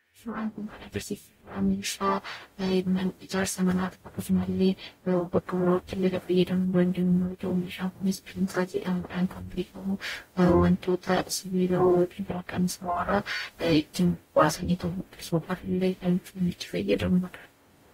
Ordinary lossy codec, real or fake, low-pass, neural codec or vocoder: AAC, 48 kbps; fake; 19.8 kHz; codec, 44.1 kHz, 0.9 kbps, DAC